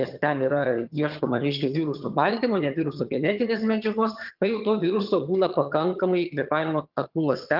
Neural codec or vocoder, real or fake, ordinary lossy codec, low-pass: vocoder, 22.05 kHz, 80 mel bands, HiFi-GAN; fake; Opus, 24 kbps; 5.4 kHz